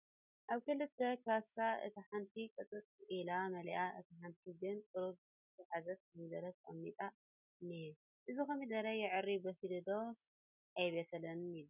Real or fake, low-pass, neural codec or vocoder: real; 3.6 kHz; none